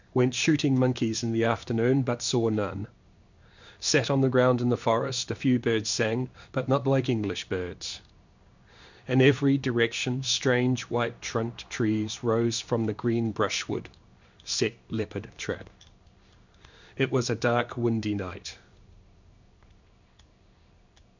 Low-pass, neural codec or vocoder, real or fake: 7.2 kHz; codec, 16 kHz in and 24 kHz out, 1 kbps, XY-Tokenizer; fake